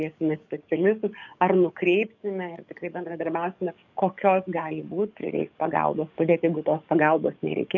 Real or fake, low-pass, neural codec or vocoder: fake; 7.2 kHz; codec, 44.1 kHz, 7.8 kbps, DAC